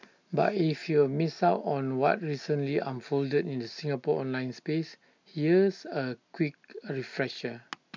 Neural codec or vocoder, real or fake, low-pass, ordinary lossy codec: none; real; 7.2 kHz; MP3, 64 kbps